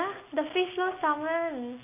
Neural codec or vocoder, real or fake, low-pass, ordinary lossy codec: none; real; 3.6 kHz; none